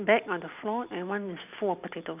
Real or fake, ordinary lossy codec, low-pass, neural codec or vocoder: real; none; 3.6 kHz; none